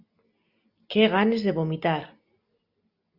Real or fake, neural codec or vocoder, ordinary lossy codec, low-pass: real; none; Opus, 64 kbps; 5.4 kHz